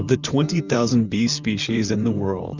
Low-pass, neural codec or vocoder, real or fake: 7.2 kHz; vocoder, 44.1 kHz, 128 mel bands, Pupu-Vocoder; fake